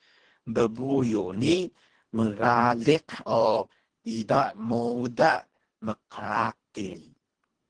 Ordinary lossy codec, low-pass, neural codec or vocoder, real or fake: Opus, 16 kbps; 9.9 kHz; codec, 24 kHz, 1.5 kbps, HILCodec; fake